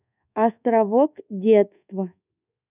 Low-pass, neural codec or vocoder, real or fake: 3.6 kHz; codec, 24 kHz, 1.2 kbps, DualCodec; fake